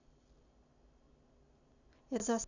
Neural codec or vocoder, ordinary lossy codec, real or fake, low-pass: none; none; real; 7.2 kHz